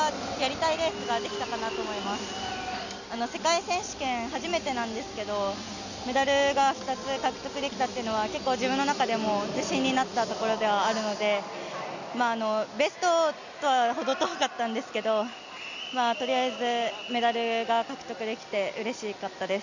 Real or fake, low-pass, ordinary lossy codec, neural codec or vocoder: real; 7.2 kHz; none; none